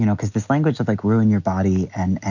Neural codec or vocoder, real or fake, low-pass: none; real; 7.2 kHz